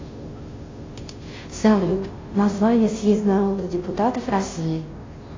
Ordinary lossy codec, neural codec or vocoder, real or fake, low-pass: AAC, 32 kbps; codec, 16 kHz, 0.5 kbps, FunCodec, trained on Chinese and English, 25 frames a second; fake; 7.2 kHz